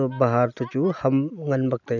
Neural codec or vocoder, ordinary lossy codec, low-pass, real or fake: none; none; 7.2 kHz; real